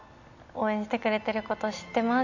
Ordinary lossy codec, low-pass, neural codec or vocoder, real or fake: none; 7.2 kHz; none; real